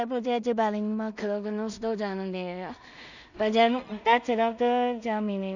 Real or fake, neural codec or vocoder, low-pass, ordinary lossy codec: fake; codec, 16 kHz in and 24 kHz out, 0.4 kbps, LongCat-Audio-Codec, two codebook decoder; 7.2 kHz; none